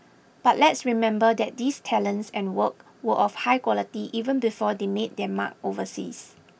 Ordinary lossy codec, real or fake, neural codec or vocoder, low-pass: none; real; none; none